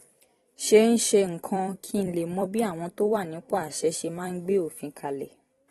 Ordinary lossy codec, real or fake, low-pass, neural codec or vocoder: AAC, 32 kbps; fake; 19.8 kHz; vocoder, 44.1 kHz, 128 mel bands every 256 samples, BigVGAN v2